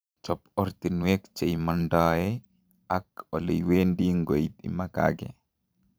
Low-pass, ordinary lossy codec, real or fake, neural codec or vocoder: none; none; fake; vocoder, 44.1 kHz, 128 mel bands every 512 samples, BigVGAN v2